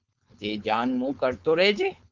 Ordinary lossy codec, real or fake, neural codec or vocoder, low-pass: Opus, 24 kbps; fake; codec, 16 kHz, 4.8 kbps, FACodec; 7.2 kHz